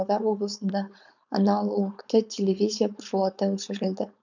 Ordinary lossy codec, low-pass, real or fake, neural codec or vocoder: none; 7.2 kHz; fake; codec, 16 kHz, 4.8 kbps, FACodec